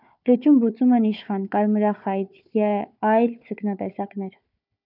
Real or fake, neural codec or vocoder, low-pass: fake; codec, 16 kHz, 4 kbps, FunCodec, trained on Chinese and English, 50 frames a second; 5.4 kHz